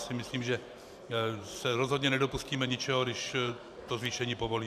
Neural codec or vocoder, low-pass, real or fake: vocoder, 44.1 kHz, 128 mel bands every 512 samples, BigVGAN v2; 14.4 kHz; fake